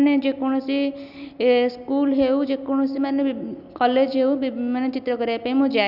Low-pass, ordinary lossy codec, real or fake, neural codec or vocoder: 5.4 kHz; none; real; none